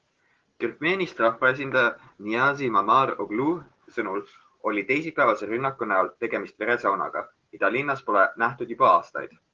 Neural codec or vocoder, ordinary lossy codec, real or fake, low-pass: none; Opus, 16 kbps; real; 7.2 kHz